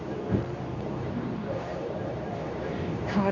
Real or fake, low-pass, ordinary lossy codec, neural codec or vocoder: fake; 7.2 kHz; none; codec, 24 kHz, 0.9 kbps, WavTokenizer, medium speech release version 2